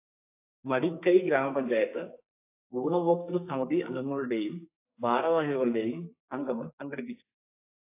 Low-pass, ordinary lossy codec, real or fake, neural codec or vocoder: 3.6 kHz; AAC, 24 kbps; fake; codec, 44.1 kHz, 2.6 kbps, SNAC